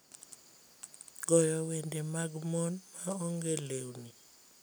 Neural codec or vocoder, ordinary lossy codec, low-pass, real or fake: none; none; none; real